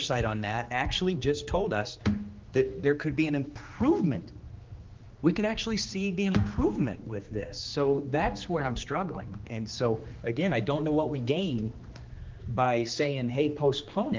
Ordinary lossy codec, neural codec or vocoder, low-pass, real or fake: Opus, 32 kbps; codec, 16 kHz, 2 kbps, X-Codec, HuBERT features, trained on general audio; 7.2 kHz; fake